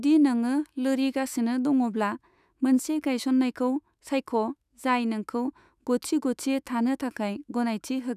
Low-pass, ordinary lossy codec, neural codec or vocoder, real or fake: 14.4 kHz; none; autoencoder, 48 kHz, 128 numbers a frame, DAC-VAE, trained on Japanese speech; fake